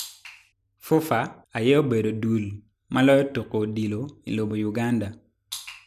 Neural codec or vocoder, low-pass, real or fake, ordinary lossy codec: none; 14.4 kHz; real; none